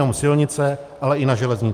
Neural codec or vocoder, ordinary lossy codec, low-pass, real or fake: none; Opus, 32 kbps; 14.4 kHz; real